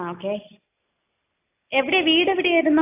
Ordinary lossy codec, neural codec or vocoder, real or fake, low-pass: AAC, 24 kbps; none; real; 3.6 kHz